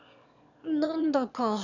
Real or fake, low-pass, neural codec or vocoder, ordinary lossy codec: fake; 7.2 kHz; autoencoder, 22.05 kHz, a latent of 192 numbers a frame, VITS, trained on one speaker; none